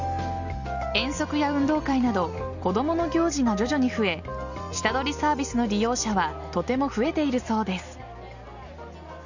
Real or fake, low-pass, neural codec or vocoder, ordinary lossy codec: real; 7.2 kHz; none; none